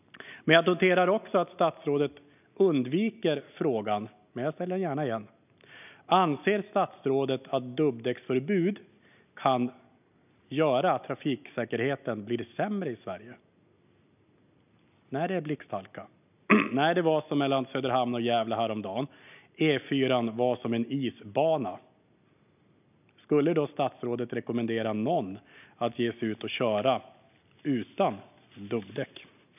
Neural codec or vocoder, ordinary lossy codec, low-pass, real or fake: none; none; 3.6 kHz; real